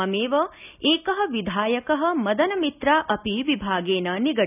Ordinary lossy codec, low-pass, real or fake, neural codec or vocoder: none; 3.6 kHz; real; none